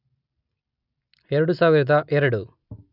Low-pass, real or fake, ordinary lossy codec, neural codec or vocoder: 5.4 kHz; real; none; none